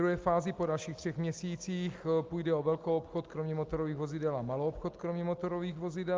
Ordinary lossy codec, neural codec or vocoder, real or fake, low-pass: Opus, 32 kbps; none; real; 7.2 kHz